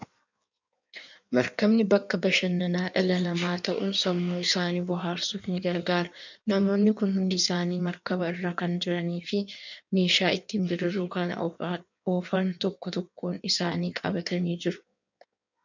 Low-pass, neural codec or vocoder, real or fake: 7.2 kHz; codec, 16 kHz in and 24 kHz out, 1.1 kbps, FireRedTTS-2 codec; fake